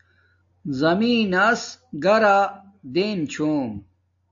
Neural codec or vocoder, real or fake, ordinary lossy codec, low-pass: none; real; AAC, 64 kbps; 7.2 kHz